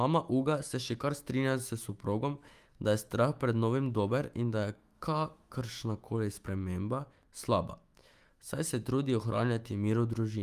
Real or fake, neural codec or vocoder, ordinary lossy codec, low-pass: fake; autoencoder, 48 kHz, 128 numbers a frame, DAC-VAE, trained on Japanese speech; Opus, 32 kbps; 14.4 kHz